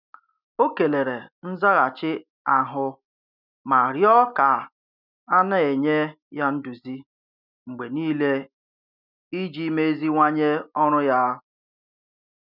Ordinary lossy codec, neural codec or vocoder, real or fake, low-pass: none; none; real; 5.4 kHz